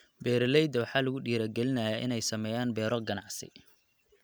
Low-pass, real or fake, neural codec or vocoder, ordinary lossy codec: none; real; none; none